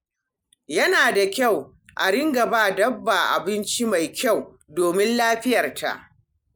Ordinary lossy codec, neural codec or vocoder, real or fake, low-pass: none; none; real; none